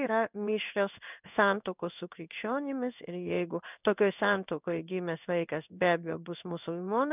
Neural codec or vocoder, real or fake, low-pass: codec, 16 kHz in and 24 kHz out, 1 kbps, XY-Tokenizer; fake; 3.6 kHz